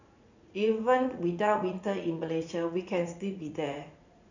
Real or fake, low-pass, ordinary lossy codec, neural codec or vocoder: fake; 7.2 kHz; none; vocoder, 44.1 kHz, 80 mel bands, Vocos